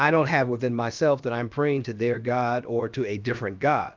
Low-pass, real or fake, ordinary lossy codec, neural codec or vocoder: 7.2 kHz; fake; Opus, 24 kbps; codec, 16 kHz, about 1 kbps, DyCAST, with the encoder's durations